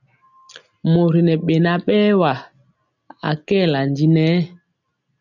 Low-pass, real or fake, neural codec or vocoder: 7.2 kHz; real; none